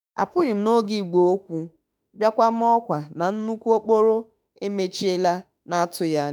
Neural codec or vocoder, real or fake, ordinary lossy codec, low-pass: autoencoder, 48 kHz, 32 numbers a frame, DAC-VAE, trained on Japanese speech; fake; none; none